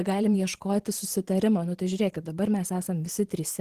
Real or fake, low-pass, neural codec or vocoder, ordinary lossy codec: fake; 14.4 kHz; vocoder, 44.1 kHz, 128 mel bands every 512 samples, BigVGAN v2; Opus, 16 kbps